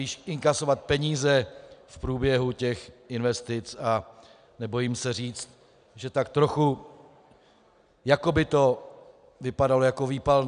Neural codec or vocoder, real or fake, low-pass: none; real; 9.9 kHz